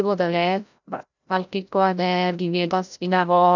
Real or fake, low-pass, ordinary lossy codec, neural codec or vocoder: fake; 7.2 kHz; none; codec, 16 kHz, 0.5 kbps, FreqCodec, larger model